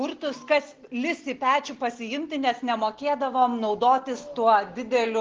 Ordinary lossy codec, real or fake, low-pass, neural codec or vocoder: Opus, 16 kbps; real; 7.2 kHz; none